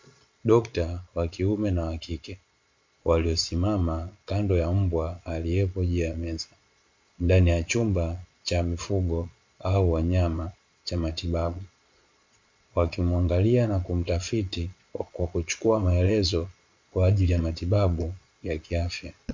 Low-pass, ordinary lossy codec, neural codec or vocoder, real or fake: 7.2 kHz; MP3, 48 kbps; vocoder, 22.05 kHz, 80 mel bands, Vocos; fake